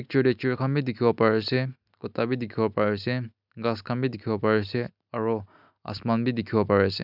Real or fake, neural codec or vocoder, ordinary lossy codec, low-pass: real; none; none; 5.4 kHz